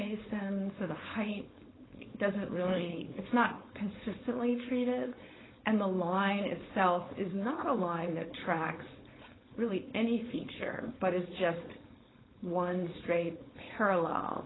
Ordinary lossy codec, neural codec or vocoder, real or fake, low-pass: AAC, 16 kbps; codec, 16 kHz, 4.8 kbps, FACodec; fake; 7.2 kHz